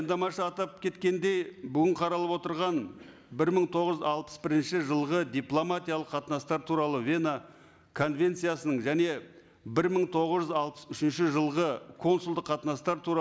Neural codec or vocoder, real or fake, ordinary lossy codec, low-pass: none; real; none; none